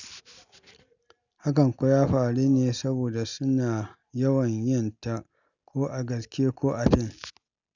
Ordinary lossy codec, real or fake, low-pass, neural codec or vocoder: none; real; 7.2 kHz; none